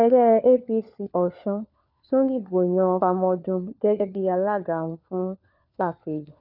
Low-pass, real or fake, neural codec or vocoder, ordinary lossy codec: 5.4 kHz; fake; codec, 16 kHz, 4 kbps, FunCodec, trained on LibriTTS, 50 frames a second; none